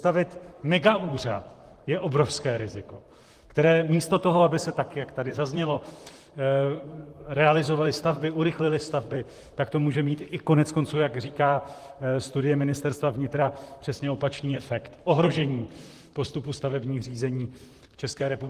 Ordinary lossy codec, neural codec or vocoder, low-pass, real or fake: Opus, 24 kbps; vocoder, 44.1 kHz, 128 mel bands, Pupu-Vocoder; 14.4 kHz; fake